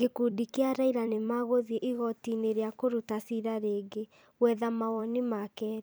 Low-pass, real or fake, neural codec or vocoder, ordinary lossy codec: none; fake; vocoder, 44.1 kHz, 128 mel bands every 256 samples, BigVGAN v2; none